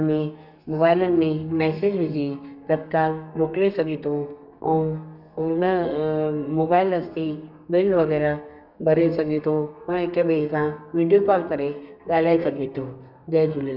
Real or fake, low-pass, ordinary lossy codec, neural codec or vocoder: fake; 5.4 kHz; none; codec, 32 kHz, 1.9 kbps, SNAC